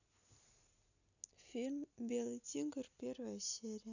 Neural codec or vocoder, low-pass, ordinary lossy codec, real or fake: none; 7.2 kHz; none; real